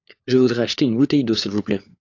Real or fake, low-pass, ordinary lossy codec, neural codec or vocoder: fake; 7.2 kHz; AAC, 48 kbps; codec, 16 kHz, 4 kbps, FunCodec, trained on LibriTTS, 50 frames a second